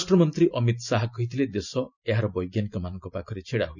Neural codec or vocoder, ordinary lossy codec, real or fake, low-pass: none; none; real; 7.2 kHz